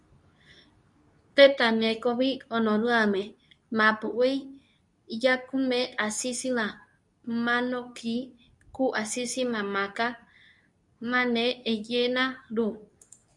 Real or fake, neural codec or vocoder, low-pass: fake; codec, 24 kHz, 0.9 kbps, WavTokenizer, medium speech release version 2; 10.8 kHz